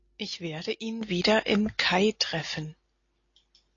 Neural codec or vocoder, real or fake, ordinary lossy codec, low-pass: none; real; AAC, 32 kbps; 7.2 kHz